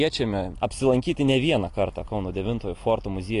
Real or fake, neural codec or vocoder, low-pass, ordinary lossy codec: real; none; 10.8 kHz; AAC, 48 kbps